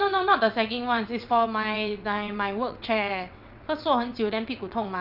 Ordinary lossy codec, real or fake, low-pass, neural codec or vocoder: none; fake; 5.4 kHz; vocoder, 22.05 kHz, 80 mel bands, WaveNeXt